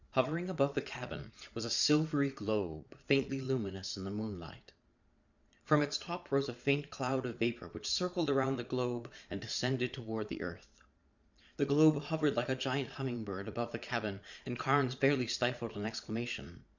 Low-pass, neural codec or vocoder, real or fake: 7.2 kHz; vocoder, 22.05 kHz, 80 mel bands, Vocos; fake